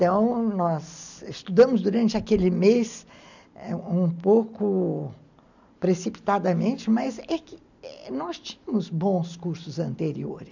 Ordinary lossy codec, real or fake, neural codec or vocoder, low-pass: none; real; none; 7.2 kHz